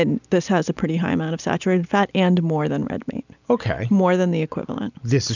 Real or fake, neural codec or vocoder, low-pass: real; none; 7.2 kHz